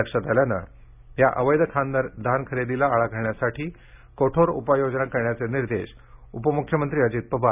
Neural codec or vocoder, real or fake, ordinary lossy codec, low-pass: none; real; none; 3.6 kHz